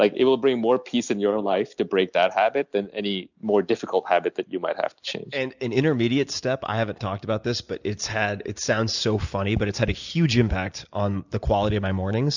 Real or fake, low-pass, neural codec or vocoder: real; 7.2 kHz; none